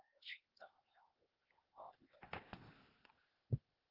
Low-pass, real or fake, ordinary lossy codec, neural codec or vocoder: 5.4 kHz; fake; Opus, 16 kbps; codec, 16 kHz, 0.8 kbps, ZipCodec